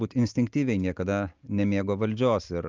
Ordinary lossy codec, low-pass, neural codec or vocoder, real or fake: Opus, 32 kbps; 7.2 kHz; none; real